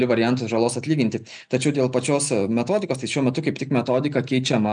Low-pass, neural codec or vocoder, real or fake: 9.9 kHz; none; real